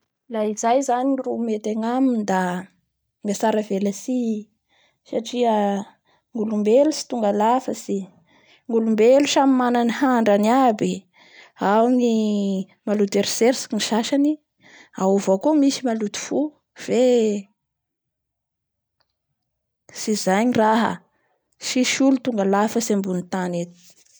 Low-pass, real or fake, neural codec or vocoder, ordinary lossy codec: none; real; none; none